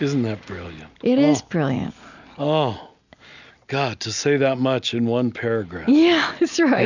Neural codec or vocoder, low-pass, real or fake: none; 7.2 kHz; real